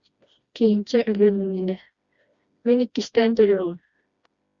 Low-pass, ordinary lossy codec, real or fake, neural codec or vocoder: 7.2 kHz; Opus, 64 kbps; fake; codec, 16 kHz, 1 kbps, FreqCodec, smaller model